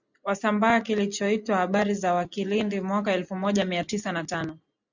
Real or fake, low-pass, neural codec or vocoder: real; 7.2 kHz; none